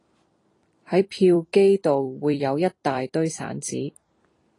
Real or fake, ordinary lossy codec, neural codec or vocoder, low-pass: fake; AAC, 32 kbps; vocoder, 44.1 kHz, 128 mel bands every 256 samples, BigVGAN v2; 10.8 kHz